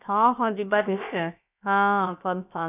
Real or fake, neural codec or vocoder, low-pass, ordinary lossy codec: fake; codec, 16 kHz, about 1 kbps, DyCAST, with the encoder's durations; 3.6 kHz; none